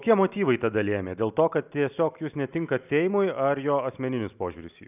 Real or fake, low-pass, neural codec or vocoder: real; 3.6 kHz; none